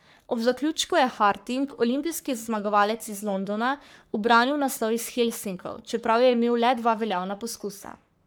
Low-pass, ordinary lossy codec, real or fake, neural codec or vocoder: none; none; fake; codec, 44.1 kHz, 3.4 kbps, Pupu-Codec